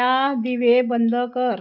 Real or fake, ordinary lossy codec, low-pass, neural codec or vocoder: real; none; 5.4 kHz; none